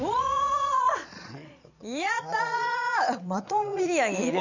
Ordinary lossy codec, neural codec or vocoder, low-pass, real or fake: none; vocoder, 22.05 kHz, 80 mel bands, Vocos; 7.2 kHz; fake